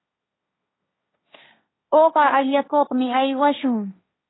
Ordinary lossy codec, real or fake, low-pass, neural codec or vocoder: AAC, 16 kbps; fake; 7.2 kHz; codec, 16 kHz, 1.1 kbps, Voila-Tokenizer